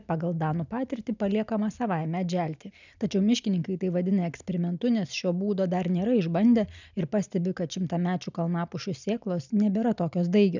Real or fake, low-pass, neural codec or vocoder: real; 7.2 kHz; none